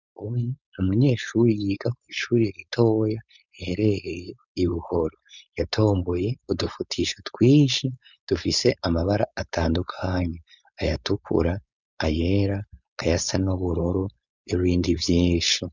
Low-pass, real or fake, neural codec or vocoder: 7.2 kHz; fake; codec, 16 kHz, 4.8 kbps, FACodec